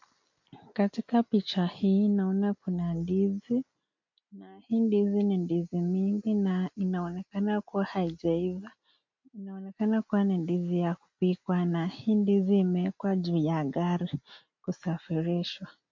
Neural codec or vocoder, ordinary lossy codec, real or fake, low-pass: none; MP3, 48 kbps; real; 7.2 kHz